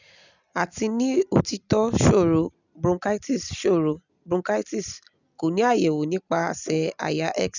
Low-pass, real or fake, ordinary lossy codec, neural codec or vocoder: 7.2 kHz; real; none; none